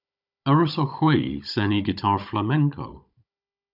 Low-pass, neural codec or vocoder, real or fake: 5.4 kHz; codec, 16 kHz, 16 kbps, FunCodec, trained on Chinese and English, 50 frames a second; fake